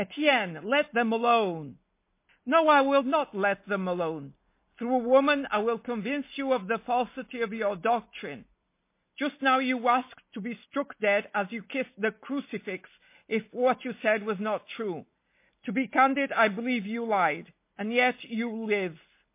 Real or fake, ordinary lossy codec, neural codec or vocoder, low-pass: real; MP3, 24 kbps; none; 3.6 kHz